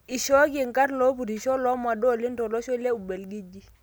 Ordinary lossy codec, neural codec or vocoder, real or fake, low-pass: none; none; real; none